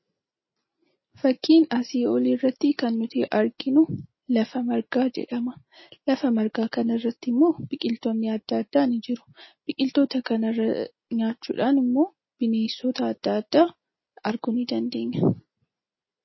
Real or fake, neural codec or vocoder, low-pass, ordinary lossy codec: real; none; 7.2 kHz; MP3, 24 kbps